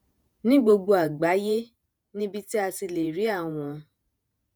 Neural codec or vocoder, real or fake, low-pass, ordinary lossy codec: vocoder, 48 kHz, 128 mel bands, Vocos; fake; none; none